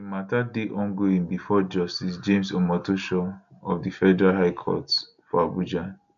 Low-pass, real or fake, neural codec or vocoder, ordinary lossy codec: 7.2 kHz; real; none; none